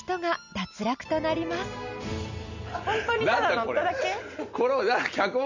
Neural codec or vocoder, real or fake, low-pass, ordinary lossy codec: none; real; 7.2 kHz; none